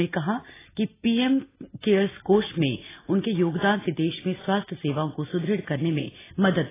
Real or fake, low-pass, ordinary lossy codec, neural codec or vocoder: real; 3.6 kHz; AAC, 16 kbps; none